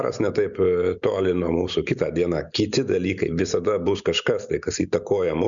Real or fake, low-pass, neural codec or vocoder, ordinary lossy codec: real; 7.2 kHz; none; MP3, 96 kbps